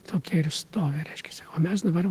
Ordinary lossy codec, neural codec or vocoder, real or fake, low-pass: Opus, 16 kbps; autoencoder, 48 kHz, 128 numbers a frame, DAC-VAE, trained on Japanese speech; fake; 14.4 kHz